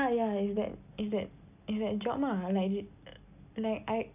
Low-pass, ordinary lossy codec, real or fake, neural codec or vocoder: 3.6 kHz; none; real; none